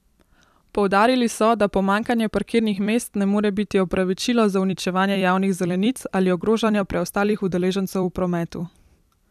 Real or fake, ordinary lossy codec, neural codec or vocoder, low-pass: fake; none; vocoder, 44.1 kHz, 128 mel bands every 256 samples, BigVGAN v2; 14.4 kHz